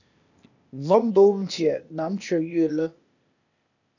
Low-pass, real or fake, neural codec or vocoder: 7.2 kHz; fake; codec, 16 kHz, 0.8 kbps, ZipCodec